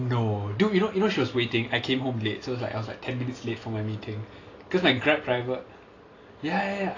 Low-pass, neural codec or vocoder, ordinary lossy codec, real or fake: 7.2 kHz; none; AAC, 32 kbps; real